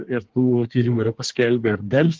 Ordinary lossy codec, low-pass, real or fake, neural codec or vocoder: Opus, 16 kbps; 7.2 kHz; fake; codec, 24 kHz, 1 kbps, SNAC